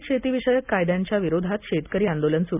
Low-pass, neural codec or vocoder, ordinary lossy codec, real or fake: 3.6 kHz; none; none; real